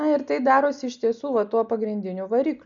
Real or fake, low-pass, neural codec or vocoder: real; 7.2 kHz; none